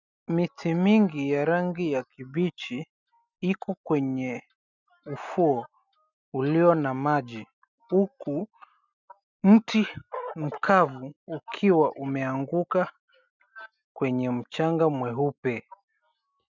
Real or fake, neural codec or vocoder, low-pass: real; none; 7.2 kHz